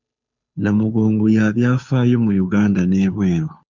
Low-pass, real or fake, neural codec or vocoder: 7.2 kHz; fake; codec, 16 kHz, 2 kbps, FunCodec, trained on Chinese and English, 25 frames a second